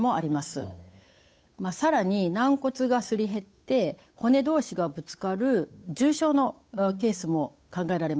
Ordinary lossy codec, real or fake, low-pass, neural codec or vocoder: none; fake; none; codec, 16 kHz, 8 kbps, FunCodec, trained on Chinese and English, 25 frames a second